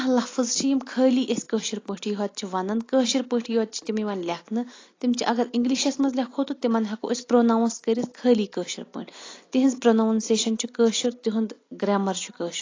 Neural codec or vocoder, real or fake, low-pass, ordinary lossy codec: none; real; 7.2 kHz; AAC, 32 kbps